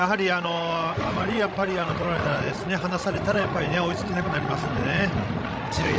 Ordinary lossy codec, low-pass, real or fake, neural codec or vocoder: none; none; fake; codec, 16 kHz, 16 kbps, FreqCodec, larger model